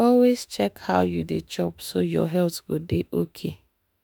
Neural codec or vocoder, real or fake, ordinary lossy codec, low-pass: autoencoder, 48 kHz, 32 numbers a frame, DAC-VAE, trained on Japanese speech; fake; none; none